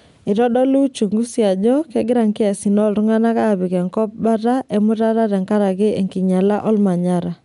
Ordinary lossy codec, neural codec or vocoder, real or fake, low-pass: none; none; real; 10.8 kHz